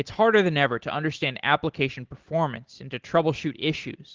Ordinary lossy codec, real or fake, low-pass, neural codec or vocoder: Opus, 16 kbps; real; 7.2 kHz; none